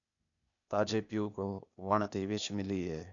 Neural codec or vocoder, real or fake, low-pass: codec, 16 kHz, 0.8 kbps, ZipCodec; fake; 7.2 kHz